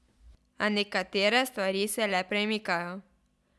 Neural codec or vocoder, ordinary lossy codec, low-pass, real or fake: none; none; none; real